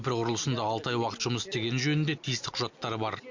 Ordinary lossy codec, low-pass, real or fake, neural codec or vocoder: Opus, 64 kbps; 7.2 kHz; real; none